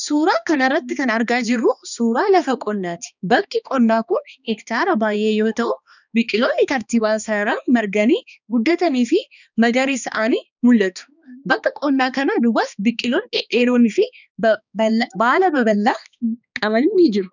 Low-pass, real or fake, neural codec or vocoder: 7.2 kHz; fake; codec, 16 kHz, 2 kbps, X-Codec, HuBERT features, trained on balanced general audio